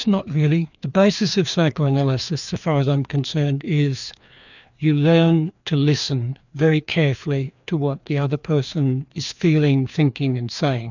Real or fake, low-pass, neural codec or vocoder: fake; 7.2 kHz; codec, 16 kHz, 2 kbps, FreqCodec, larger model